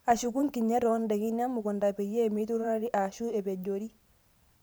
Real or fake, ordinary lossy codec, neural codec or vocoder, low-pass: fake; none; vocoder, 44.1 kHz, 128 mel bands every 512 samples, BigVGAN v2; none